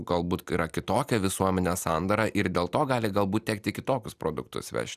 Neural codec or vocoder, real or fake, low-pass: none; real; 14.4 kHz